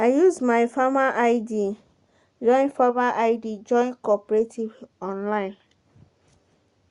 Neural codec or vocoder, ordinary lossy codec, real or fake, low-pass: none; Opus, 64 kbps; real; 10.8 kHz